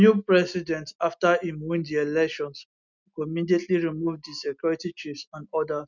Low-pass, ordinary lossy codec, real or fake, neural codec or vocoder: 7.2 kHz; none; real; none